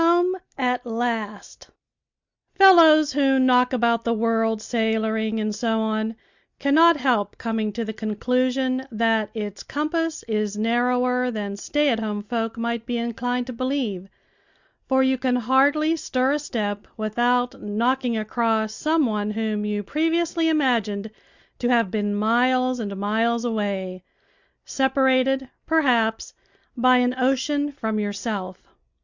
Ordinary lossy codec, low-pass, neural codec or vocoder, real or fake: Opus, 64 kbps; 7.2 kHz; none; real